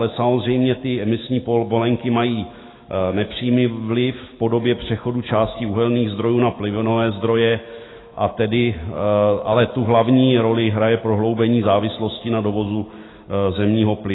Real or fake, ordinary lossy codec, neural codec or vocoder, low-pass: fake; AAC, 16 kbps; autoencoder, 48 kHz, 128 numbers a frame, DAC-VAE, trained on Japanese speech; 7.2 kHz